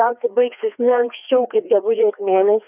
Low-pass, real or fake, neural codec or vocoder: 3.6 kHz; fake; codec, 16 kHz, 2 kbps, FreqCodec, larger model